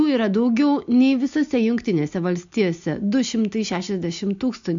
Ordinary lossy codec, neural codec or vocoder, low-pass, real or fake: MP3, 64 kbps; none; 7.2 kHz; real